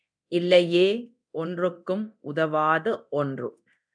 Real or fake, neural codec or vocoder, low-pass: fake; codec, 24 kHz, 0.9 kbps, DualCodec; 9.9 kHz